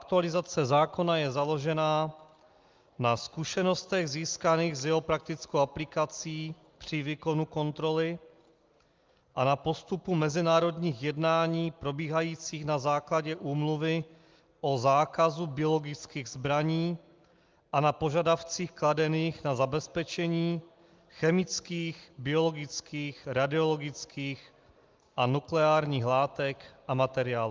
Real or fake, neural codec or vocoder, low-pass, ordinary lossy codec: real; none; 7.2 kHz; Opus, 32 kbps